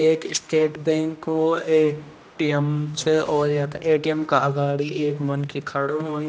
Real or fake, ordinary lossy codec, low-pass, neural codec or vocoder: fake; none; none; codec, 16 kHz, 1 kbps, X-Codec, HuBERT features, trained on general audio